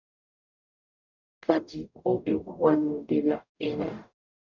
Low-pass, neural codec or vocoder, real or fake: 7.2 kHz; codec, 44.1 kHz, 0.9 kbps, DAC; fake